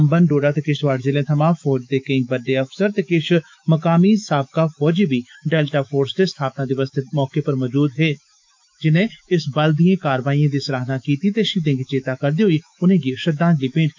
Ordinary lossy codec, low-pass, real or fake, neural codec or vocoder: MP3, 64 kbps; 7.2 kHz; fake; autoencoder, 48 kHz, 128 numbers a frame, DAC-VAE, trained on Japanese speech